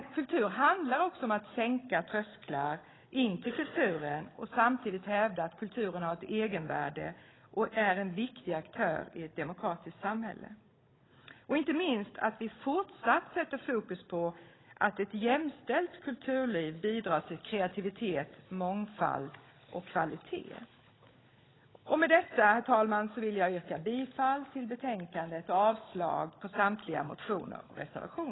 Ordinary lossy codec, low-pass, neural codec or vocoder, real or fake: AAC, 16 kbps; 7.2 kHz; codec, 16 kHz, 16 kbps, FunCodec, trained on Chinese and English, 50 frames a second; fake